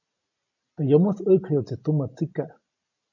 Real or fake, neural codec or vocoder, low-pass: real; none; 7.2 kHz